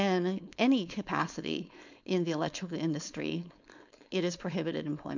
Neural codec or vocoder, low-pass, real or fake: codec, 16 kHz, 4.8 kbps, FACodec; 7.2 kHz; fake